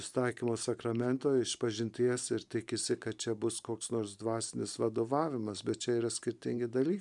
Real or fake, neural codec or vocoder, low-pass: fake; vocoder, 44.1 kHz, 128 mel bands every 512 samples, BigVGAN v2; 10.8 kHz